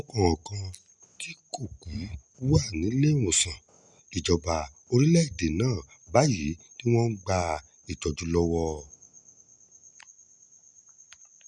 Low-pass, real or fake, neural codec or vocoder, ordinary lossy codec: 10.8 kHz; real; none; none